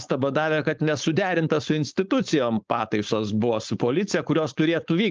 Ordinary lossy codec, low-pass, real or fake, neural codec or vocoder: Opus, 24 kbps; 7.2 kHz; fake; codec, 16 kHz, 4.8 kbps, FACodec